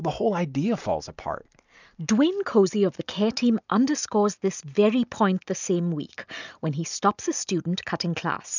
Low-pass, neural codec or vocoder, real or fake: 7.2 kHz; none; real